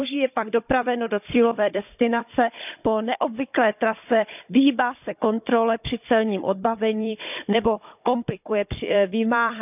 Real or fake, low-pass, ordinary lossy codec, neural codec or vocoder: fake; 3.6 kHz; none; codec, 16 kHz, 16 kbps, FunCodec, trained on Chinese and English, 50 frames a second